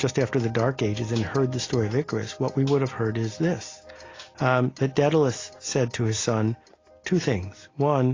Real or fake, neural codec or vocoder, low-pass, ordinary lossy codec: real; none; 7.2 kHz; AAC, 32 kbps